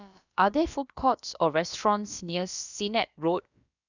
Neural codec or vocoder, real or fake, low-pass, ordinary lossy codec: codec, 16 kHz, about 1 kbps, DyCAST, with the encoder's durations; fake; 7.2 kHz; none